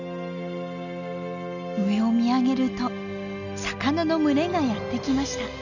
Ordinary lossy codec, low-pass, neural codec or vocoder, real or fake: none; 7.2 kHz; none; real